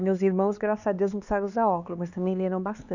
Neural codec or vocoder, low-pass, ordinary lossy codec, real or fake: codec, 16 kHz, 4 kbps, X-Codec, HuBERT features, trained on LibriSpeech; 7.2 kHz; none; fake